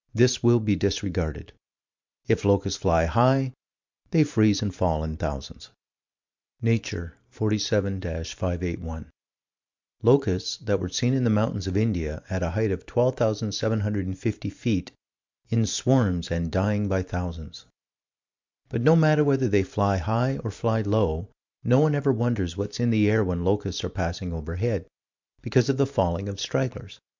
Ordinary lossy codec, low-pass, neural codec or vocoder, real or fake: MP3, 64 kbps; 7.2 kHz; vocoder, 44.1 kHz, 128 mel bands every 512 samples, BigVGAN v2; fake